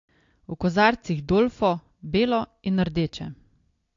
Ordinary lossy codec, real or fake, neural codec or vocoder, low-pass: AAC, 48 kbps; real; none; 7.2 kHz